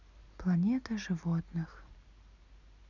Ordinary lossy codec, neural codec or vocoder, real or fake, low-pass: none; none; real; 7.2 kHz